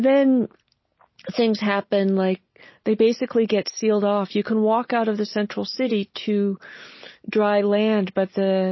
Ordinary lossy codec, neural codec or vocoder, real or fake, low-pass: MP3, 24 kbps; none; real; 7.2 kHz